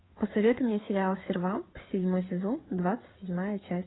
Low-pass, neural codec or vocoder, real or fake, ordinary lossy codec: 7.2 kHz; codec, 16 kHz, 4 kbps, FreqCodec, larger model; fake; AAC, 16 kbps